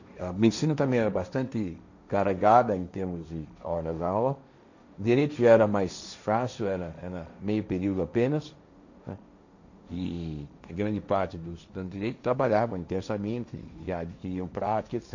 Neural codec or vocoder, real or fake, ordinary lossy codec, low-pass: codec, 16 kHz, 1.1 kbps, Voila-Tokenizer; fake; none; 7.2 kHz